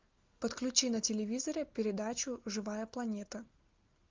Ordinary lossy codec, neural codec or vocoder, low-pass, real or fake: Opus, 32 kbps; none; 7.2 kHz; real